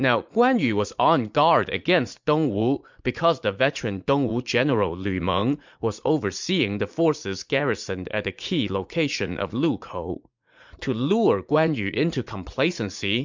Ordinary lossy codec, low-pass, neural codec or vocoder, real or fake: MP3, 64 kbps; 7.2 kHz; vocoder, 44.1 kHz, 80 mel bands, Vocos; fake